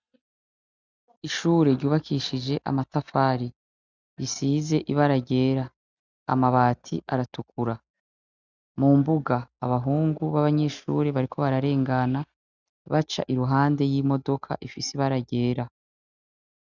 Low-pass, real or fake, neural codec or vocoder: 7.2 kHz; real; none